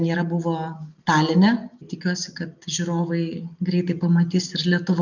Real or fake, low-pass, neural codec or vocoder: real; 7.2 kHz; none